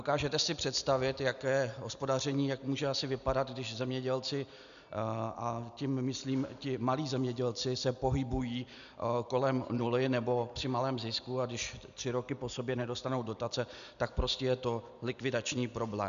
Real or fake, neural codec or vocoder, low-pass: real; none; 7.2 kHz